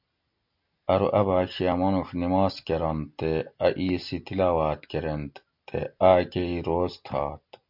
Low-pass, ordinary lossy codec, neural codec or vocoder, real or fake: 5.4 kHz; MP3, 48 kbps; none; real